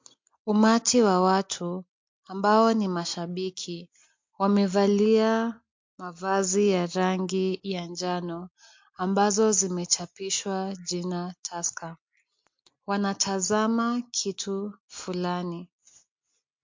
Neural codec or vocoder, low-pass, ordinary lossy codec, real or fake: none; 7.2 kHz; MP3, 48 kbps; real